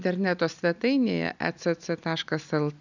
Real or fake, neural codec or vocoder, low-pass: real; none; 7.2 kHz